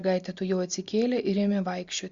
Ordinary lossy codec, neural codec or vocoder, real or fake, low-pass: Opus, 64 kbps; none; real; 7.2 kHz